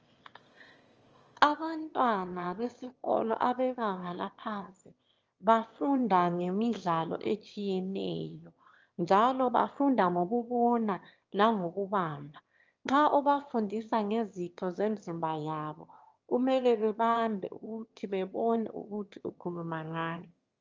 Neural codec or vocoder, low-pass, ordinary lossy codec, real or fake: autoencoder, 22.05 kHz, a latent of 192 numbers a frame, VITS, trained on one speaker; 7.2 kHz; Opus, 24 kbps; fake